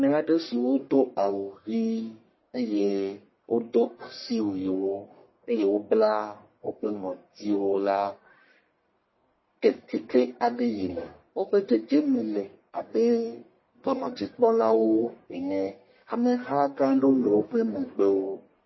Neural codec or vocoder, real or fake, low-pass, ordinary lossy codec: codec, 44.1 kHz, 1.7 kbps, Pupu-Codec; fake; 7.2 kHz; MP3, 24 kbps